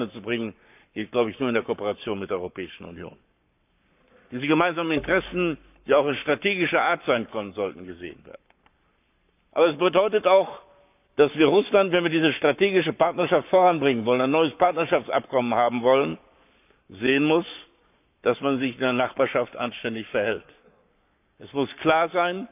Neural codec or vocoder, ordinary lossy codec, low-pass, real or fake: codec, 44.1 kHz, 7.8 kbps, Pupu-Codec; none; 3.6 kHz; fake